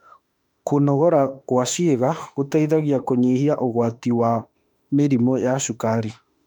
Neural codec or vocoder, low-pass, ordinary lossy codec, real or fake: autoencoder, 48 kHz, 32 numbers a frame, DAC-VAE, trained on Japanese speech; 19.8 kHz; none; fake